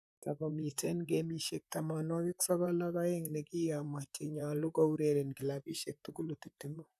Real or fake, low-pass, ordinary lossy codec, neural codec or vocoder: fake; 14.4 kHz; none; vocoder, 44.1 kHz, 128 mel bands, Pupu-Vocoder